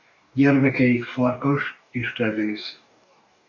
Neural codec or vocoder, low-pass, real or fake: codec, 44.1 kHz, 2.6 kbps, DAC; 7.2 kHz; fake